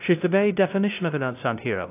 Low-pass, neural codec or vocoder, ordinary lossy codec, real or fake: 3.6 kHz; codec, 16 kHz, 0.5 kbps, FunCodec, trained on LibriTTS, 25 frames a second; AAC, 24 kbps; fake